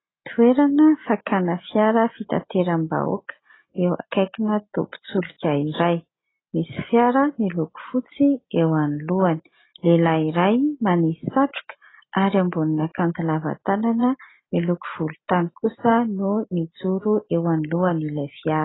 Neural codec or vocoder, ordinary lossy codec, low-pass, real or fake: none; AAC, 16 kbps; 7.2 kHz; real